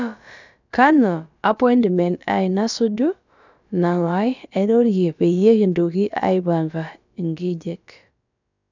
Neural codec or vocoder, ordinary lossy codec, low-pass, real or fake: codec, 16 kHz, about 1 kbps, DyCAST, with the encoder's durations; none; 7.2 kHz; fake